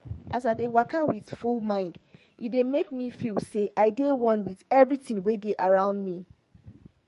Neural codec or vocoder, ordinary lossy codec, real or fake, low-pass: codec, 44.1 kHz, 2.6 kbps, SNAC; MP3, 48 kbps; fake; 14.4 kHz